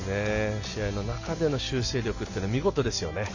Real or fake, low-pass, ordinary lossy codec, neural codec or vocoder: real; 7.2 kHz; none; none